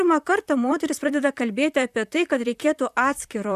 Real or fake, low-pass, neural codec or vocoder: fake; 14.4 kHz; vocoder, 44.1 kHz, 128 mel bands, Pupu-Vocoder